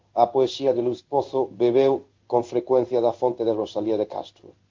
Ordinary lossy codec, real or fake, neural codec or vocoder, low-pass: Opus, 32 kbps; fake; codec, 16 kHz in and 24 kHz out, 1 kbps, XY-Tokenizer; 7.2 kHz